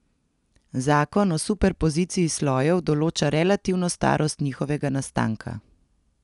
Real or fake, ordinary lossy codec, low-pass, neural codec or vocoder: real; none; 10.8 kHz; none